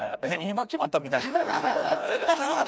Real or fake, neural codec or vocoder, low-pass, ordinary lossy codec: fake; codec, 16 kHz, 1 kbps, FreqCodec, larger model; none; none